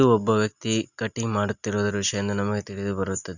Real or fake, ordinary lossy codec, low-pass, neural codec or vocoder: real; none; 7.2 kHz; none